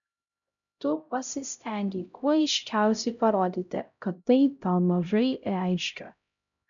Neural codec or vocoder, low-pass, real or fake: codec, 16 kHz, 0.5 kbps, X-Codec, HuBERT features, trained on LibriSpeech; 7.2 kHz; fake